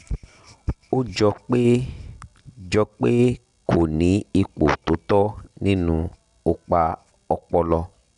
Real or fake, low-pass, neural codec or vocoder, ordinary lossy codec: real; 10.8 kHz; none; none